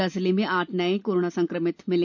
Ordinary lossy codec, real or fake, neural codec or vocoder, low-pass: none; real; none; 7.2 kHz